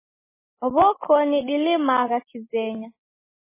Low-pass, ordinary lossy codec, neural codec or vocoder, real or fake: 3.6 kHz; MP3, 16 kbps; none; real